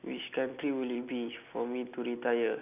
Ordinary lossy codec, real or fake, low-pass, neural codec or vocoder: none; real; 3.6 kHz; none